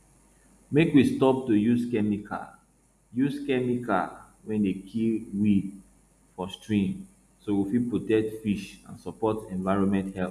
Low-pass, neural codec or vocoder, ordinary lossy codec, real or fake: 14.4 kHz; none; none; real